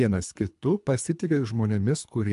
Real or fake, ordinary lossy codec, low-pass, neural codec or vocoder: fake; MP3, 64 kbps; 10.8 kHz; codec, 24 kHz, 3 kbps, HILCodec